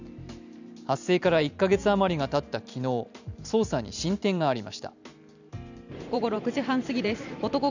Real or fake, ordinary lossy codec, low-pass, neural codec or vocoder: fake; none; 7.2 kHz; vocoder, 44.1 kHz, 128 mel bands every 512 samples, BigVGAN v2